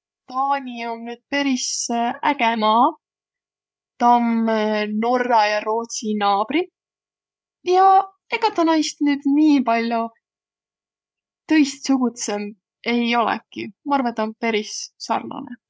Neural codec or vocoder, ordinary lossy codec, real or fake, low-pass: codec, 16 kHz, 8 kbps, FreqCodec, larger model; none; fake; none